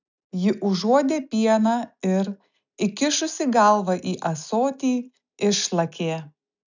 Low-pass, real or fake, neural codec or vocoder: 7.2 kHz; real; none